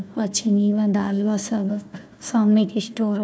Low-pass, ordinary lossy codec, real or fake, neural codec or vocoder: none; none; fake; codec, 16 kHz, 1 kbps, FunCodec, trained on Chinese and English, 50 frames a second